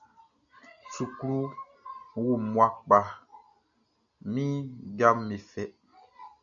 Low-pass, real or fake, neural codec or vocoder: 7.2 kHz; real; none